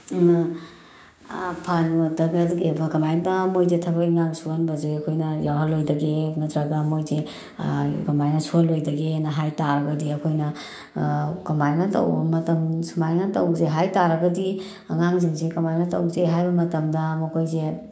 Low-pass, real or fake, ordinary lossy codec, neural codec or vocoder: none; fake; none; codec, 16 kHz, 6 kbps, DAC